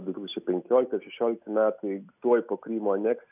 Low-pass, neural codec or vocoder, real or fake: 3.6 kHz; none; real